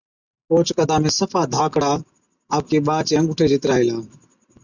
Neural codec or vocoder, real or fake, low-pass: none; real; 7.2 kHz